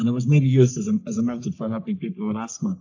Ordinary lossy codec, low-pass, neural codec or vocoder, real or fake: AAC, 48 kbps; 7.2 kHz; codec, 44.1 kHz, 3.4 kbps, Pupu-Codec; fake